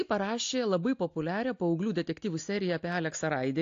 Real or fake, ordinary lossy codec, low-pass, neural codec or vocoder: real; AAC, 48 kbps; 7.2 kHz; none